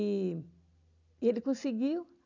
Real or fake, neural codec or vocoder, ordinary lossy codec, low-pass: real; none; none; 7.2 kHz